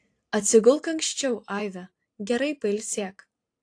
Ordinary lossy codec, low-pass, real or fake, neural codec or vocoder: AAC, 48 kbps; 9.9 kHz; fake; vocoder, 44.1 kHz, 128 mel bands every 512 samples, BigVGAN v2